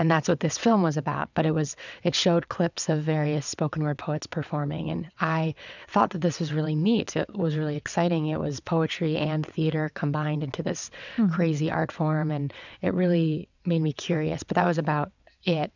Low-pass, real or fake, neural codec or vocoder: 7.2 kHz; fake; vocoder, 22.05 kHz, 80 mel bands, WaveNeXt